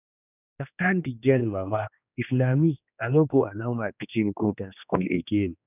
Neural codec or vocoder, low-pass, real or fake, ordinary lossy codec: codec, 16 kHz, 2 kbps, X-Codec, HuBERT features, trained on general audio; 3.6 kHz; fake; none